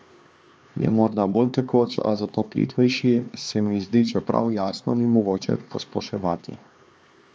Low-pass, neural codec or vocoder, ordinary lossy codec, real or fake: none; codec, 16 kHz, 2 kbps, X-Codec, HuBERT features, trained on LibriSpeech; none; fake